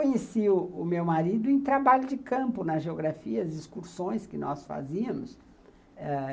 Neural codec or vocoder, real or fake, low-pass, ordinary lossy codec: none; real; none; none